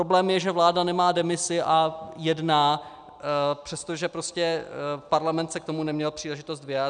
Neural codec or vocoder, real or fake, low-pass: none; real; 9.9 kHz